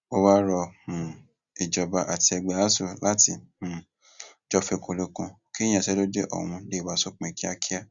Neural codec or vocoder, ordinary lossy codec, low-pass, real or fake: none; Opus, 64 kbps; 7.2 kHz; real